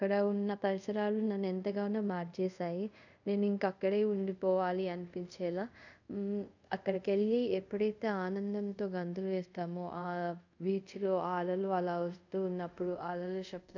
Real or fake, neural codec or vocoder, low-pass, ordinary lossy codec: fake; codec, 24 kHz, 0.5 kbps, DualCodec; 7.2 kHz; none